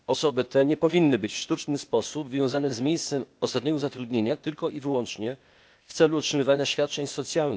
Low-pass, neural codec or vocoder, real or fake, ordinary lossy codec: none; codec, 16 kHz, 0.8 kbps, ZipCodec; fake; none